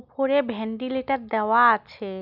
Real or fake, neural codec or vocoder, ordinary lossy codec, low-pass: real; none; none; 5.4 kHz